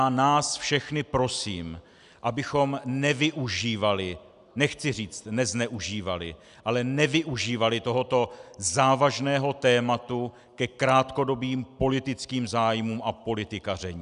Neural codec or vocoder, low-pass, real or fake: none; 10.8 kHz; real